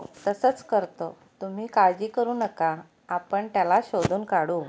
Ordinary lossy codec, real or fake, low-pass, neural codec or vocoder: none; real; none; none